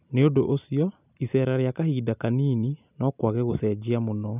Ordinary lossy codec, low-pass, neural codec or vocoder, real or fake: none; 3.6 kHz; none; real